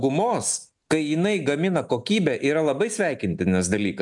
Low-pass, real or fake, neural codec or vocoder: 10.8 kHz; real; none